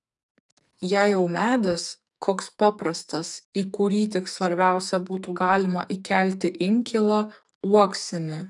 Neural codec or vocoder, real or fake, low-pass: codec, 44.1 kHz, 2.6 kbps, SNAC; fake; 10.8 kHz